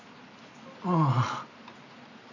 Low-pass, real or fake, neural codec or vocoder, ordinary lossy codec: 7.2 kHz; real; none; none